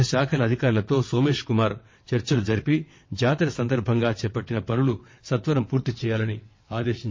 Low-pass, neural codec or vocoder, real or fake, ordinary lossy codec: 7.2 kHz; vocoder, 22.05 kHz, 80 mel bands, WaveNeXt; fake; MP3, 32 kbps